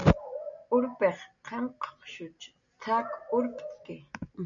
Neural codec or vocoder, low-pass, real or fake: none; 7.2 kHz; real